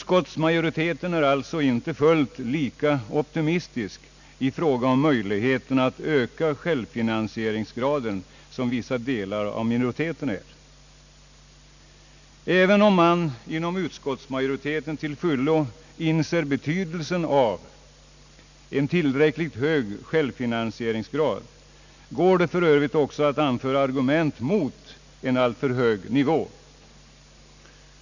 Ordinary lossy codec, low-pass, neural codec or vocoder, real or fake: none; 7.2 kHz; none; real